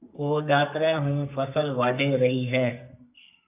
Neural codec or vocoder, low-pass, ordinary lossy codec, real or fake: codec, 32 kHz, 1.9 kbps, SNAC; 3.6 kHz; AAC, 32 kbps; fake